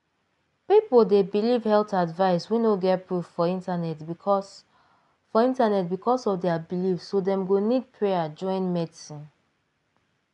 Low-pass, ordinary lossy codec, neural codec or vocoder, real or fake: 10.8 kHz; none; none; real